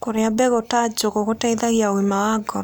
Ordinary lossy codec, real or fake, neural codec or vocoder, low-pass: none; real; none; none